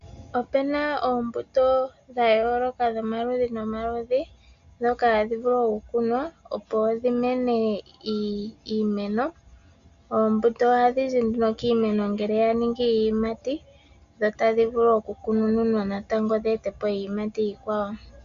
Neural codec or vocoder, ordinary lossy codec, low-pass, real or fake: none; Opus, 64 kbps; 7.2 kHz; real